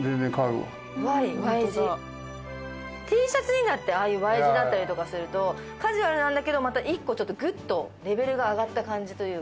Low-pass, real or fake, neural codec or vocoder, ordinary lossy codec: none; real; none; none